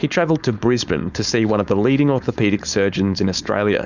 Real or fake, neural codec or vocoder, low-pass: fake; codec, 16 kHz, 4.8 kbps, FACodec; 7.2 kHz